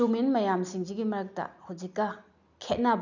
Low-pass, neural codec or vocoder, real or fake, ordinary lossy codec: 7.2 kHz; none; real; none